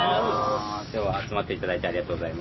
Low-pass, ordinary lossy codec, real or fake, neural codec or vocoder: 7.2 kHz; MP3, 24 kbps; real; none